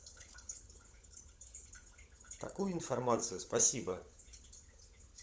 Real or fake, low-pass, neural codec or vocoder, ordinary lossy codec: fake; none; codec, 16 kHz, 16 kbps, FunCodec, trained on LibriTTS, 50 frames a second; none